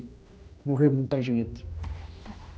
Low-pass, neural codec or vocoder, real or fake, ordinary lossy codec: none; codec, 16 kHz, 1 kbps, X-Codec, HuBERT features, trained on general audio; fake; none